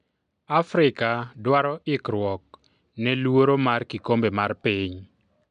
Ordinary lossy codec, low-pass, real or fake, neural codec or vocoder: MP3, 96 kbps; 9.9 kHz; real; none